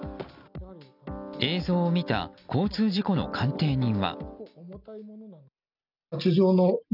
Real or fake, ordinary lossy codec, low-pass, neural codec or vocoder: real; none; 5.4 kHz; none